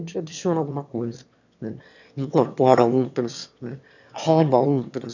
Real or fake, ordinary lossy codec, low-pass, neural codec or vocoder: fake; none; 7.2 kHz; autoencoder, 22.05 kHz, a latent of 192 numbers a frame, VITS, trained on one speaker